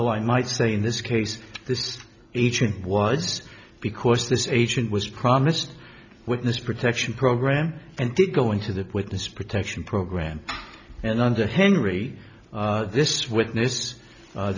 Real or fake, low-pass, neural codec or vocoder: real; 7.2 kHz; none